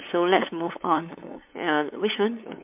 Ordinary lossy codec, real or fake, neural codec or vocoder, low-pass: MP3, 32 kbps; fake; codec, 16 kHz, 8 kbps, FunCodec, trained on LibriTTS, 25 frames a second; 3.6 kHz